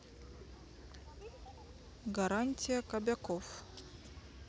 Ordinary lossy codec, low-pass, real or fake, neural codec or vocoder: none; none; real; none